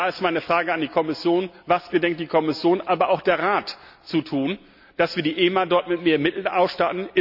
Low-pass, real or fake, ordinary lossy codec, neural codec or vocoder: 5.4 kHz; real; none; none